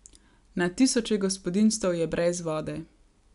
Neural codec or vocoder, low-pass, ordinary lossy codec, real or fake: none; 10.8 kHz; none; real